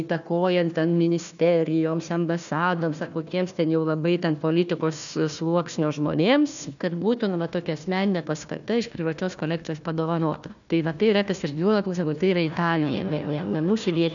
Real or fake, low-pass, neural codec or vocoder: fake; 7.2 kHz; codec, 16 kHz, 1 kbps, FunCodec, trained on Chinese and English, 50 frames a second